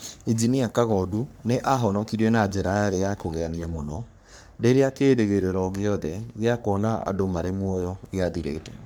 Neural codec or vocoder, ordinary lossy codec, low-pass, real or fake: codec, 44.1 kHz, 3.4 kbps, Pupu-Codec; none; none; fake